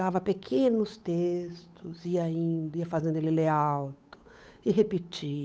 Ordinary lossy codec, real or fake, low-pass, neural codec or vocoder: none; fake; none; codec, 16 kHz, 8 kbps, FunCodec, trained on Chinese and English, 25 frames a second